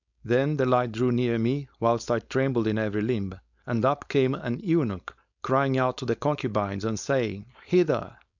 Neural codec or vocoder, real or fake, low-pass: codec, 16 kHz, 4.8 kbps, FACodec; fake; 7.2 kHz